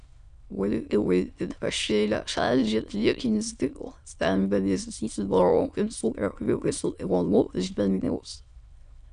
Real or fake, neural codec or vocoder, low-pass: fake; autoencoder, 22.05 kHz, a latent of 192 numbers a frame, VITS, trained on many speakers; 9.9 kHz